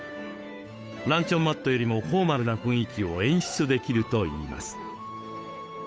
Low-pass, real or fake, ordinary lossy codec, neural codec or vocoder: none; fake; none; codec, 16 kHz, 8 kbps, FunCodec, trained on Chinese and English, 25 frames a second